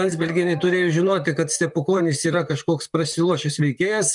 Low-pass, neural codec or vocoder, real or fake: 10.8 kHz; vocoder, 44.1 kHz, 128 mel bands, Pupu-Vocoder; fake